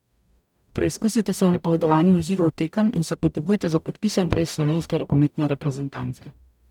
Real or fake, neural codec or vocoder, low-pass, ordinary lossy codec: fake; codec, 44.1 kHz, 0.9 kbps, DAC; 19.8 kHz; none